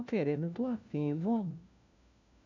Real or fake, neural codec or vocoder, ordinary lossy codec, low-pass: fake; codec, 16 kHz, 1 kbps, FunCodec, trained on LibriTTS, 50 frames a second; none; 7.2 kHz